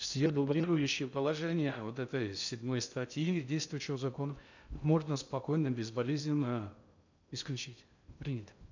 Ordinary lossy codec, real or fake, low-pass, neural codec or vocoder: none; fake; 7.2 kHz; codec, 16 kHz in and 24 kHz out, 0.6 kbps, FocalCodec, streaming, 2048 codes